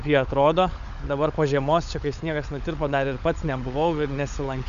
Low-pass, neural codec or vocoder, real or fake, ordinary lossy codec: 7.2 kHz; codec, 16 kHz, 16 kbps, FunCodec, trained on LibriTTS, 50 frames a second; fake; MP3, 96 kbps